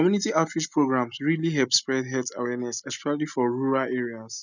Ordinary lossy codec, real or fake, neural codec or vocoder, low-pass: none; real; none; 7.2 kHz